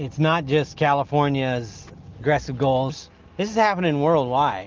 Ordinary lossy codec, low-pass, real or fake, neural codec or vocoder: Opus, 24 kbps; 7.2 kHz; real; none